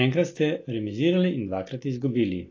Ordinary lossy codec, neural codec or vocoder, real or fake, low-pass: AAC, 32 kbps; none; real; 7.2 kHz